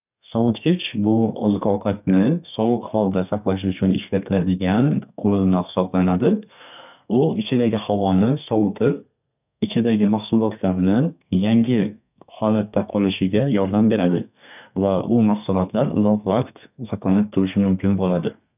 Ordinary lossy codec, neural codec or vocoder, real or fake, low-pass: none; codec, 32 kHz, 1.9 kbps, SNAC; fake; 3.6 kHz